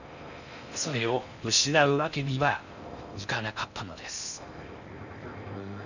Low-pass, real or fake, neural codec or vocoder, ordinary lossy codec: 7.2 kHz; fake; codec, 16 kHz in and 24 kHz out, 0.6 kbps, FocalCodec, streaming, 4096 codes; none